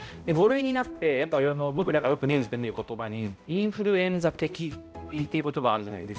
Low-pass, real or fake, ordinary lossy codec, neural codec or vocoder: none; fake; none; codec, 16 kHz, 0.5 kbps, X-Codec, HuBERT features, trained on balanced general audio